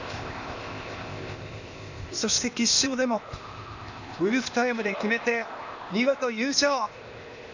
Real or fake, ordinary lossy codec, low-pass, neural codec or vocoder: fake; none; 7.2 kHz; codec, 16 kHz, 0.8 kbps, ZipCodec